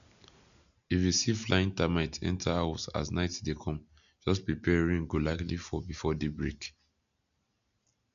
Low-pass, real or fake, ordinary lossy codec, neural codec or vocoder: 7.2 kHz; real; none; none